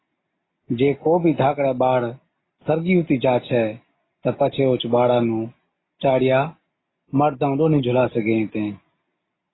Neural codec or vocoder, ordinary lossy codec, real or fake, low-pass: none; AAC, 16 kbps; real; 7.2 kHz